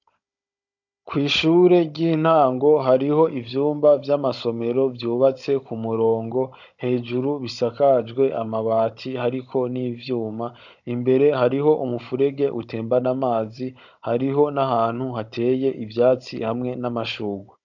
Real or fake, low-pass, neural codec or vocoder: fake; 7.2 kHz; codec, 16 kHz, 16 kbps, FunCodec, trained on Chinese and English, 50 frames a second